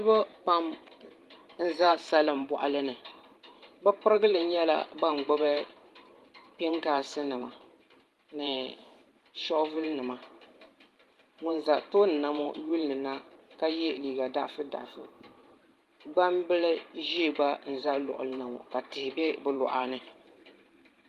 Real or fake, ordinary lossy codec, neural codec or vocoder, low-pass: fake; Opus, 24 kbps; vocoder, 44.1 kHz, 128 mel bands every 512 samples, BigVGAN v2; 14.4 kHz